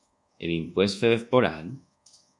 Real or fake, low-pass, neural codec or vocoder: fake; 10.8 kHz; codec, 24 kHz, 1.2 kbps, DualCodec